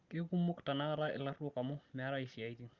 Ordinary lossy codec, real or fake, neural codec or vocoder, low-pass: Opus, 32 kbps; real; none; 7.2 kHz